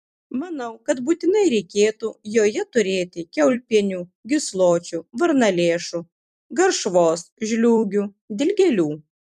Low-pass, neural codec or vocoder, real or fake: 14.4 kHz; vocoder, 44.1 kHz, 128 mel bands every 256 samples, BigVGAN v2; fake